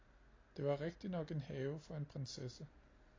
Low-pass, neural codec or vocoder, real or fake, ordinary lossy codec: 7.2 kHz; none; real; AAC, 32 kbps